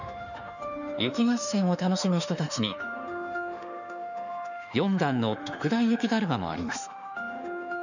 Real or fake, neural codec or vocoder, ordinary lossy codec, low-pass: fake; autoencoder, 48 kHz, 32 numbers a frame, DAC-VAE, trained on Japanese speech; none; 7.2 kHz